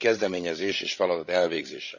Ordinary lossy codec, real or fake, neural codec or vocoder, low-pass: none; fake; codec, 16 kHz, 16 kbps, FreqCodec, larger model; 7.2 kHz